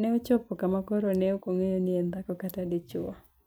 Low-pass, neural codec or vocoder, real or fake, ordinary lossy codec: none; vocoder, 44.1 kHz, 128 mel bands every 256 samples, BigVGAN v2; fake; none